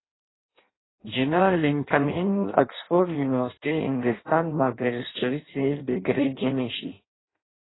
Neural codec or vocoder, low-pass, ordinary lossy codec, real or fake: codec, 16 kHz in and 24 kHz out, 0.6 kbps, FireRedTTS-2 codec; 7.2 kHz; AAC, 16 kbps; fake